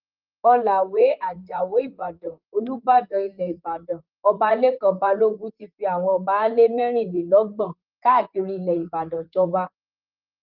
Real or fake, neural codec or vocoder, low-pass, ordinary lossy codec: fake; vocoder, 44.1 kHz, 128 mel bands, Pupu-Vocoder; 5.4 kHz; Opus, 32 kbps